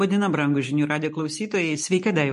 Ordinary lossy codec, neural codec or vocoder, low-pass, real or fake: MP3, 48 kbps; none; 14.4 kHz; real